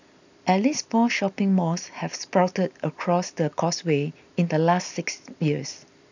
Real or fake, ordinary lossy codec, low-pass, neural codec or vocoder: real; none; 7.2 kHz; none